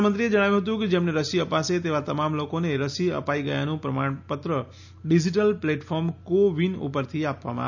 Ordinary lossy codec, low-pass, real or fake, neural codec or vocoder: none; 7.2 kHz; real; none